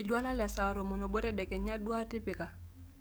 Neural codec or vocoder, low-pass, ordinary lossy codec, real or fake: codec, 44.1 kHz, 7.8 kbps, DAC; none; none; fake